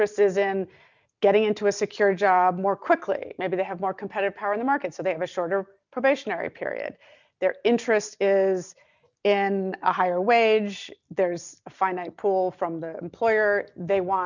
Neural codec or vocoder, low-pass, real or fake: none; 7.2 kHz; real